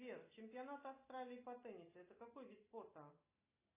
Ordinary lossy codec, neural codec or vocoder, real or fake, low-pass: AAC, 16 kbps; none; real; 3.6 kHz